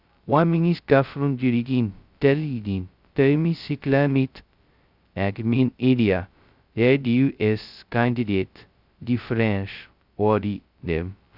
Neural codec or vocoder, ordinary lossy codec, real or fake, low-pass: codec, 16 kHz, 0.2 kbps, FocalCodec; Opus, 64 kbps; fake; 5.4 kHz